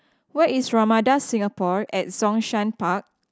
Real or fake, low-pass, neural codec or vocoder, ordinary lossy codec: real; none; none; none